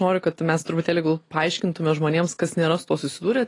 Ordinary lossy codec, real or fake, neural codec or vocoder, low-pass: AAC, 32 kbps; real; none; 10.8 kHz